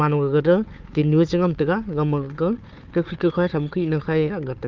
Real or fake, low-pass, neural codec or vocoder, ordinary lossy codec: fake; 7.2 kHz; codec, 16 kHz, 4 kbps, FunCodec, trained on Chinese and English, 50 frames a second; Opus, 32 kbps